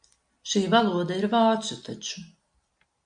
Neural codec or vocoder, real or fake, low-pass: none; real; 9.9 kHz